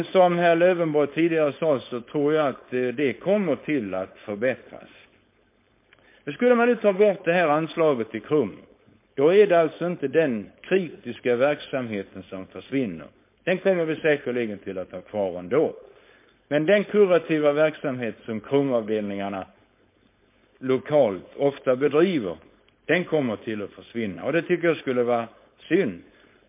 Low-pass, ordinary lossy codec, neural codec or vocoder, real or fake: 3.6 kHz; MP3, 24 kbps; codec, 16 kHz, 4.8 kbps, FACodec; fake